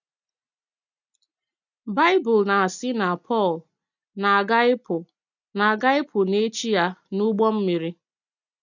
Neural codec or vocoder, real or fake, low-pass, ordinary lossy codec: none; real; 7.2 kHz; none